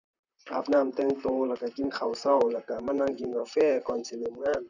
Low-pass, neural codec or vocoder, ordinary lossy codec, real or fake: 7.2 kHz; vocoder, 44.1 kHz, 128 mel bands, Pupu-Vocoder; none; fake